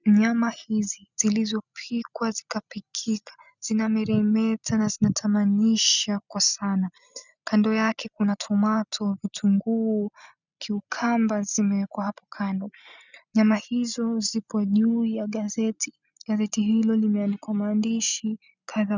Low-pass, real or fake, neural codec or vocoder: 7.2 kHz; real; none